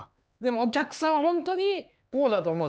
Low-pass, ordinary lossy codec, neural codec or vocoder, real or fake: none; none; codec, 16 kHz, 2 kbps, X-Codec, HuBERT features, trained on LibriSpeech; fake